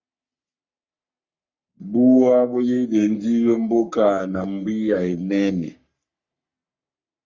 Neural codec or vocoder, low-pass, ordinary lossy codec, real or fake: codec, 44.1 kHz, 3.4 kbps, Pupu-Codec; 7.2 kHz; Opus, 64 kbps; fake